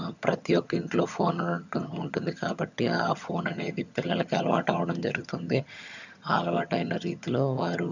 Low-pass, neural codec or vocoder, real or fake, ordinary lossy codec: 7.2 kHz; vocoder, 22.05 kHz, 80 mel bands, HiFi-GAN; fake; none